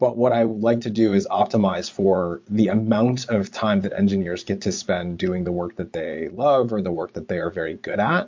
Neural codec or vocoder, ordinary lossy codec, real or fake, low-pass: vocoder, 22.05 kHz, 80 mel bands, Vocos; MP3, 48 kbps; fake; 7.2 kHz